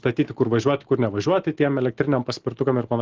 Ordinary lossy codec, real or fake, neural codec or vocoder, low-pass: Opus, 16 kbps; real; none; 7.2 kHz